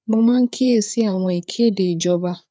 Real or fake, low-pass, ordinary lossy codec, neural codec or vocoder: fake; none; none; codec, 16 kHz, 4 kbps, FreqCodec, larger model